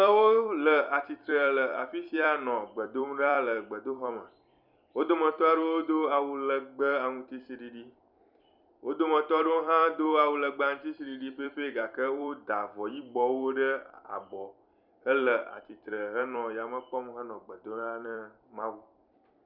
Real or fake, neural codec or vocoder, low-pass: fake; vocoder, 44.1 kHz, 128 mel bands every 256 samples, BigVGAN v2; 5.4 kHz